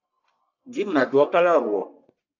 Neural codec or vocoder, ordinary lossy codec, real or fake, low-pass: codec, 44.1 kHz, 1.7 kbps, Pupu-Codec; AAC, 48 kbps; fake; 7.2 kHz